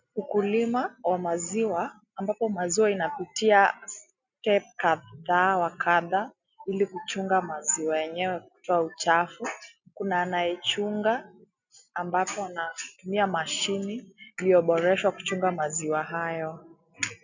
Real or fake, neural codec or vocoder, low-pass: real; none; 7.2 kHz